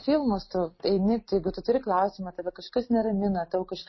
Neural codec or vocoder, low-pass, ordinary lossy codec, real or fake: none; 7.2 kHz; MP3, 24 kbps; real